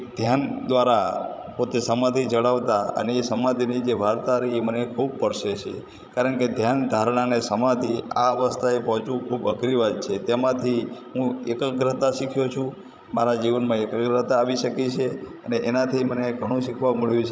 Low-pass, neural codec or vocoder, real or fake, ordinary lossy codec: none; codec, 16 kHz, 16 kbps, FreqCodec, larger model; fake; none